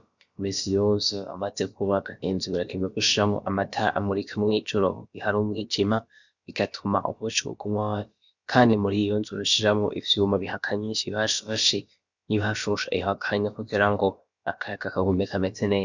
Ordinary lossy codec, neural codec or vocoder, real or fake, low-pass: Opus, 64 kbps; codec, 16 kHz, about 1 kbps, DyCAST, with the encoder's durations; fake; 7.2 kHz